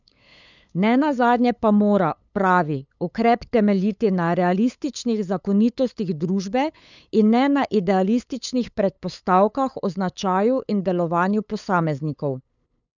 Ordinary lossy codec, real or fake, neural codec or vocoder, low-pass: none; fake; codec, 16 kHz, 8 kbps, FunCodec, trained on LibriTTS, 25 frames a second; 7.2 kHz